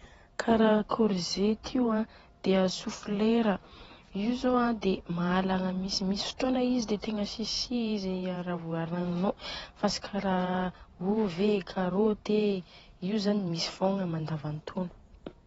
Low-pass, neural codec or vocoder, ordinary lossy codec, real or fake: 19.8 kHz; vocoder, 48 kHz, 128 mel bands, Vocos; AAC, 24 kbps; fake